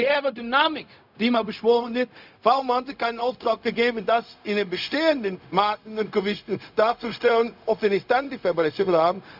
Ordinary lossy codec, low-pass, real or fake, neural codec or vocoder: none; 5.4 kHz; fake; codec, 16 kHz, 0.4 kbps, LongCat-Audio-Codec